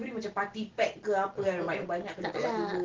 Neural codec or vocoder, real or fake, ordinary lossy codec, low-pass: none; real; Opus, 16 kbps; 7.2 kHz